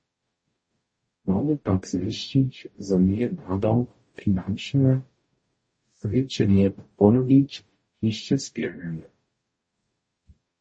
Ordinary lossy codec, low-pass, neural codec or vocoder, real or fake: MP3, 32 kbps; 9.9 kHz; codec, 44.1 kHz, 0.9 kbps, DAC; fake